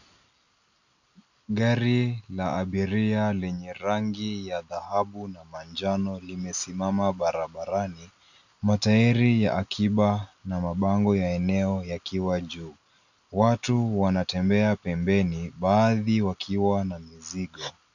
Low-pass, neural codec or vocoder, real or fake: 7.2 kHz; none; real